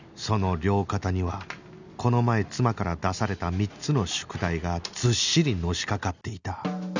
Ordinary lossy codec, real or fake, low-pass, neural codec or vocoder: none; real; 7.2 kHz; none